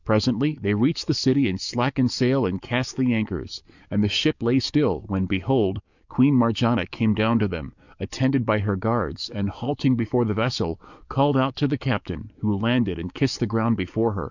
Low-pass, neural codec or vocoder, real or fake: 7.2 kHz; codec, 44.1 kHz, 7.8 kbps, DAC; fake